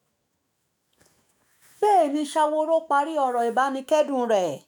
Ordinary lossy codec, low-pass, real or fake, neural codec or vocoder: none; none; fake; autoencoder, 48 kHz, 128 numbers a frame, DAC-VAE, trained on Japanese speech